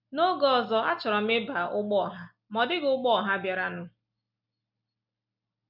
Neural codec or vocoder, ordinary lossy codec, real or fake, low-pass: none; none; real; 5.4 kHz